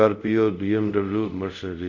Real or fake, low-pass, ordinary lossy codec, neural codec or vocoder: fake; 7.2 kHz; none; codec, 24 kHz, 0.5 kbps, DualCodec